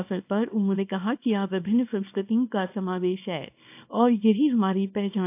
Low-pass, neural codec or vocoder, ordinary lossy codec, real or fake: 3.6 kHz; codec, 24 kHz, 0.9 kbps, WavTokenizer, small release; AAC, 32 kbps; fake